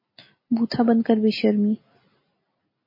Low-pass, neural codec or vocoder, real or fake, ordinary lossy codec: 5.4 kHz; none; real; MP3, 24 kbps